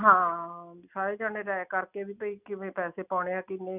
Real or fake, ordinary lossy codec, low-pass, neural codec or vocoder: real; none; 3.6 kHz; none